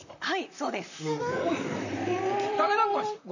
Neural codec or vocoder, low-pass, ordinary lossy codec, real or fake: vocoder, 44.1 kHz, 128 mel bands, Pupu-Vocoder; 7.2 kHz; none; fake